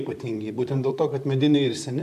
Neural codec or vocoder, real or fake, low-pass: vocoder, 44.1 kHz, 128 mel bands, Pupu-Vocoder; fake; 14.4 kHz